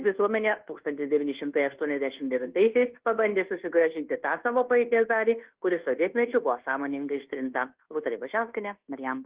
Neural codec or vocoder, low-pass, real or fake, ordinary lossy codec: codec, 24 kHz, 1.2 kbps, DualCodec; 3.6 kHz; fake; Opus, 16 kbps